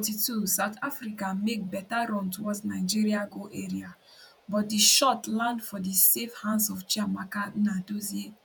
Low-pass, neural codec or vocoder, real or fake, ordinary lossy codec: none; vocoder, 48 kHz, 128 mel bands, Vocos; fake; none